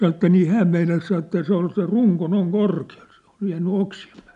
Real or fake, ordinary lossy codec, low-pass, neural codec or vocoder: real; none; 10.8 kHz; none